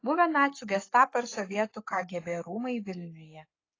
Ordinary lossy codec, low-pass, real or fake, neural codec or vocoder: AAC, 32 kbps; 7.2 kHz; fake; codec, 44.1 kHz, 7.8 kbps, Pupu-Codec